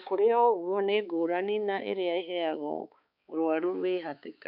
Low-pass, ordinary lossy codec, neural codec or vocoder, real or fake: 5.4 kHz; none; codec, 16 kHz, 4 kbps, X-Codec, HuBERT features, trained on balanced general audio; fake